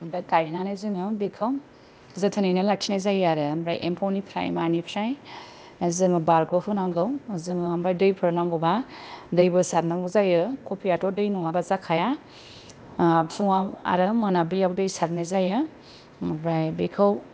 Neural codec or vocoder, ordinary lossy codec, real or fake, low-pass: codec, 16 kHz, 0.8 kbps, ZipCodec; none; fake; none